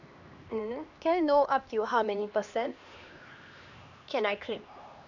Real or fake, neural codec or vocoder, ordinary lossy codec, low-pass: fake; codec, 16 kHz, 2 kbps, X-Codec, HuBERT features, trained on LibriSpeech; none; 7.2 kHz